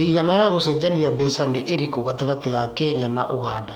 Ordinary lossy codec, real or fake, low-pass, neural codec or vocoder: none; fake; 19.8 kHz; codec, 44.1 kHz, 2.6 kbps, DAC